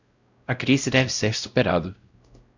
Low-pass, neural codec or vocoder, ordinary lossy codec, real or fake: 7.2 kHz; codec, 16 kHz, 0.5 kbps, X-Codec, WavLM features, trained on Multilingual LibriSpeech; Opus, 64 kbps; fake